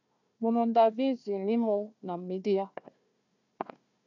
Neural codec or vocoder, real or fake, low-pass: codec, 16 kHz, 1 kbps, FunCodec, trained on Chinese and English, 50 frames a second; fake; 7.2 kHz